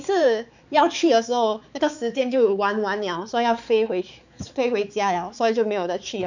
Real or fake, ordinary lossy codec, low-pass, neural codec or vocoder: fake; none; 7.2 kHz; codec, 16 kHz, 4 kbps, X-Codec, HuBERT features, trained on balanced general audio